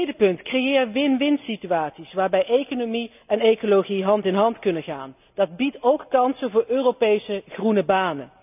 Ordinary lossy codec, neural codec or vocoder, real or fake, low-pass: none; none; real; 3.6 kHz